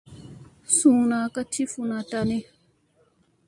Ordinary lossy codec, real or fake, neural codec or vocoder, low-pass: MP3, 64 kbps; real; none; 10.8 kHz